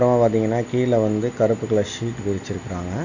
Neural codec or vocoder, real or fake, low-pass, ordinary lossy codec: none; real; 7.2 kHz; none